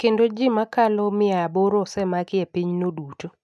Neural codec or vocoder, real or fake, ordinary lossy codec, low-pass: none; real; none; none